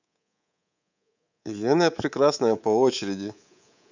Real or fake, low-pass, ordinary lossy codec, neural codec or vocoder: fake; 7.2 kHz; none; codec, 24 kHz, 3.1 kbps, DualCodec